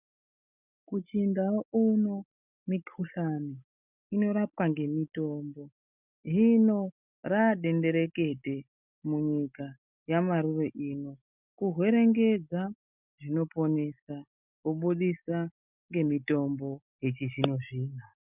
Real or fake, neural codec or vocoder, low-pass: real; none; 3.6 kHz